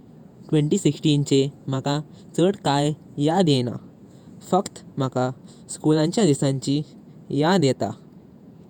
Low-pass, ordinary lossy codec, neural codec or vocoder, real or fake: 19.8 kHz; none; vocoder, 48 kHz, 128 mel bands, Vocos; fake